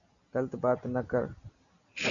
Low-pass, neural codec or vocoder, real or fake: 7.2 kHz; none; real